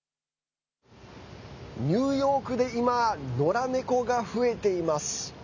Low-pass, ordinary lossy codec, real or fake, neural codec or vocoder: 7.2 kHz; none; real; none